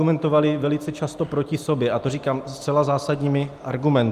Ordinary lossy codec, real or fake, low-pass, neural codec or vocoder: Opus, 32 kbps; real; 14.4 kHz; none